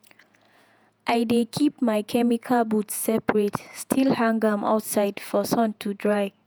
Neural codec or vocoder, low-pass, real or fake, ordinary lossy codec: vocoder, 48 kHz, 128 mel bands, Vocos; none; fake; none